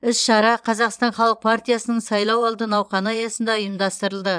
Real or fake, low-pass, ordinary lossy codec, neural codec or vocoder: fake; 9.9 kHz; none; vocoder, 22.05 kHz, 80 mel bands, Vocos